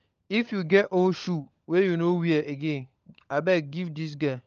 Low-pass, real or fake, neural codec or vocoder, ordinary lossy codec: 7.2 kHz; fake; codec, 16 kHz, 16 kbps, FunCodec, trained on LibriTTS, 50 frames a second; Opus, 24 kbps